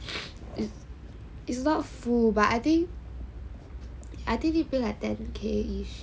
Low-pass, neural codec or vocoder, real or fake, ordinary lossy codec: none; none; real; none